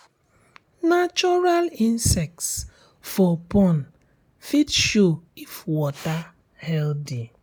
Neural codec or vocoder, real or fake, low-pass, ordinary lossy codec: none; real; none; none